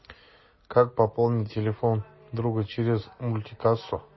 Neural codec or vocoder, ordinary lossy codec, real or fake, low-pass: none; MP3, 24 kbps; real; 7.2 kHz